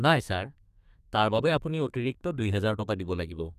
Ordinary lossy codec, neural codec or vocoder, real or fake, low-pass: none; codec, 32 kHz, 1.9 kbps, SNAC; fake; 14.4 kHz